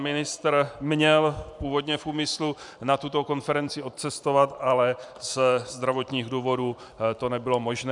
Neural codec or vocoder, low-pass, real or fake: vocoder, 44.1 kHz, 128 mel bands every 512 samples, BigVGAN v2; 10.8 kHz; fake